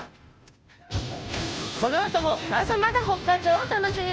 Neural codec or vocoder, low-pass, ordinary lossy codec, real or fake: codec, 16 kHz, 0.5 kbps, FunCodec, trained on Chinese and English, 25 frames a second; none; none; fake